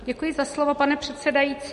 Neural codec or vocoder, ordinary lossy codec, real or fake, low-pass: none; MP3, 48 kbps; real; 14.4 kHz